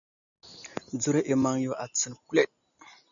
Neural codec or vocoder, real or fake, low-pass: none; real; 7.2 kHz